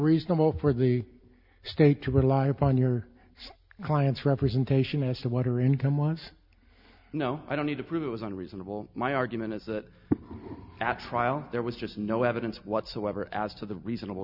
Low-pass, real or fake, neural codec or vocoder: 5.4 kHz; real; none